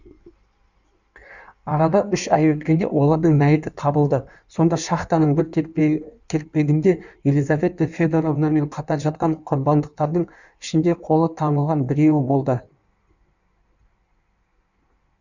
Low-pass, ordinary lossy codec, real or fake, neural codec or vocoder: 7.2 kHz; none; fake; codec, 16 kHz in and 24 kHz out, 1.1 kbps, FireRedTTS-2 codec